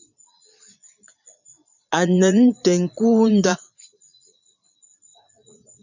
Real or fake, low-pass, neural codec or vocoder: fake; 7.2 kHz; vocoder, 22.05 kHz, 80 mel bands, Vocos